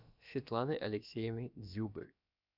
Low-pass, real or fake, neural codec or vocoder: 5.4 kHz; fake; codec, 16 kHz, about 1 kbps, DyCAST, with the encoder's durations